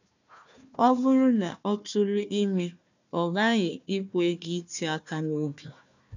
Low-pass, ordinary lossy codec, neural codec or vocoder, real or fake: 7.2 kHz; none; codec, 16 kHz, 1 kbps, FunCodec, trained on Chinese and English, 50 frames a second; fake